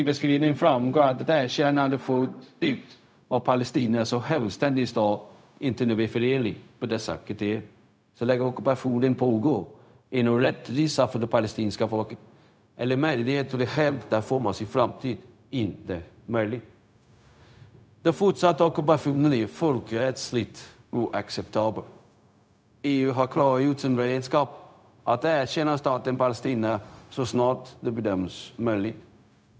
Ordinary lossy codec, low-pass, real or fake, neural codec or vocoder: none; none; fake; codec, 16 kHz, 0.4 kbps, LongCat-Audio-Codec